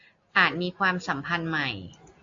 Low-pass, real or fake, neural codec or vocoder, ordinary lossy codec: 7.2 kHz; real; none; AAC, 48 kbps